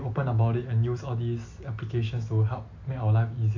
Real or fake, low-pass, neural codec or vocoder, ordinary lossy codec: real; 7.2 kHz; none; AAC, 48 kbps